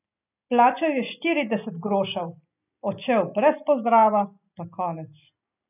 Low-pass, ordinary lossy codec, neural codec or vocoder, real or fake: 3.6 kHz; none; none; real